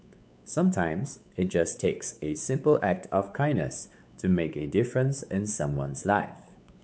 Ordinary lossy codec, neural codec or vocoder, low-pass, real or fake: none; codec, 16 kHz, 4 kbps, X-Codec, WavLM features, trained on Multilingual LibriSpeech; none; fake